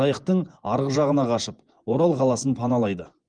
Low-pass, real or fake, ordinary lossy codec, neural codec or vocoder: 9.9 kHz; fake; Opus, 24 kbps; vocoder, 24 kHz, 100 mel bands, Vocos